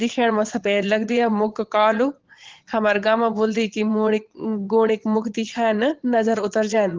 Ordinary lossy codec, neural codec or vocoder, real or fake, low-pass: Opus, 16 kbps; vocoder, 22.05 kHz, 80 mel bands, Vocos; fake; 7.2 kHz